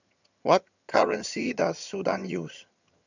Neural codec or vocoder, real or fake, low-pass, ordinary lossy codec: vocoder, 22.05 kHz, 80 mel bands, HiFi-GAN; fake; 7.2 kHz; none